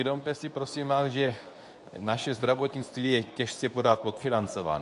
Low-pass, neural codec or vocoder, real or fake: 10.8 kHz; codec, 24 kHz, 0.9 kbps, WavTokenizer, medium speech release version 2; fake